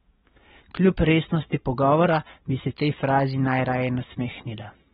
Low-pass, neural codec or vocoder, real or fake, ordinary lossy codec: 19.8 kHz; none; real; AAC, 16 kbps